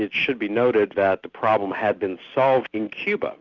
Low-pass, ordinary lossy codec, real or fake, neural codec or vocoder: 7.2 kHz; Opus, 64 kbps; real; none